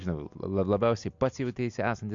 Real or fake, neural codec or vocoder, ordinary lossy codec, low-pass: real; none; AAC, 64 kbps; 7.2 kHz